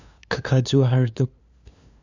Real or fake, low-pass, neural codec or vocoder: fake; 7.2 kHz; codec, 16 kHz, 2 kbps, FunCodec, trained on LibriTTS, 25 frames a second